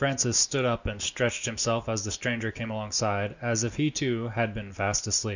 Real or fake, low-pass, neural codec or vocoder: real; 7.2 kHz; none